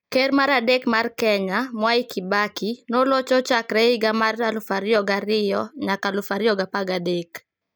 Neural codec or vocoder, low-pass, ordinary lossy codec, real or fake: none; none; none; real